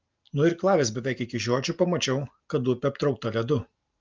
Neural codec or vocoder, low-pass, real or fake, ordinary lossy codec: none; 7.2 kHz; real; Opus, 24 kbps